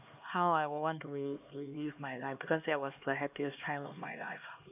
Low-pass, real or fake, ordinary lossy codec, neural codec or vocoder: 3.6 kHz; fake; none; codec, 16 kHz, 2 kbps, X-Codec, HuBERT features, trained on LibriSpeech